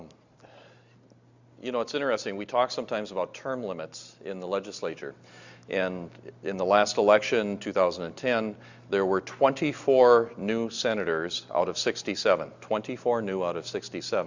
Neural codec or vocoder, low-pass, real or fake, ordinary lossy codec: none; 7.2 kHz; real; Opus, 64 kbps